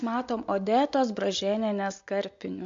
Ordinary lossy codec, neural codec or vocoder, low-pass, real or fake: MP3, 48 kbps; none; 7.2 kHz; real